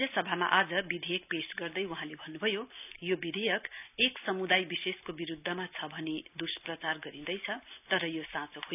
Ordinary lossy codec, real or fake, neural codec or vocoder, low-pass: none; real; none; 3.6 kHz